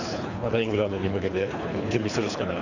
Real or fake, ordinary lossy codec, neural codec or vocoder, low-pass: fake; none; codec, 24 kHz, 3 kbps, HILCodec; 7.2 kHz